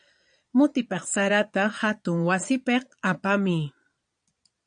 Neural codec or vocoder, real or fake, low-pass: vocoder, 22.05 kHz, 80 mel bands, Vocos; fake; 9.9 kHz